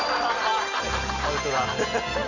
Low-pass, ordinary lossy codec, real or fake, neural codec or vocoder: 7.2 kHz; none; real; none